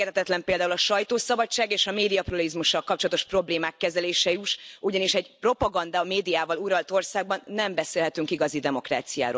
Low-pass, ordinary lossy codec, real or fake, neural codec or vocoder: none; none; real; none